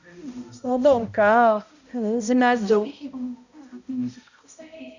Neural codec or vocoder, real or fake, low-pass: codec, 16 kHz, 0.5 kbps, X-Codec, HuBERT features, trained on balanced general audio; fake; 7.2 kHz